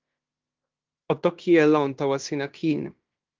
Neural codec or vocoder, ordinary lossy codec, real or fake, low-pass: codec, 16 kHz in and 24 kHz out, 0.9 kbps, LongCat-Audio-Codec, fine tuned four codebook decoder; Opus, 32 kbps; fake; 7.2 kHz